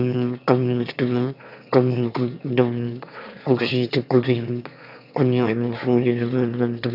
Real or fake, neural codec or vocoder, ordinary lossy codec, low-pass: fake; autoencoder, 22.05 kHz, a latent of 192 numbers a frame, VITS, trained on one speaker; none; 5.4 kHz